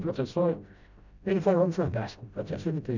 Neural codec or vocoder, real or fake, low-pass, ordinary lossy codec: codec, 16 kHz, 0.5 kbps, FreqCodec, smaller model; fake; 7.2 kHz; none